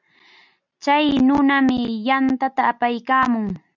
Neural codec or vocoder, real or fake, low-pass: none; real; 7.2 kHz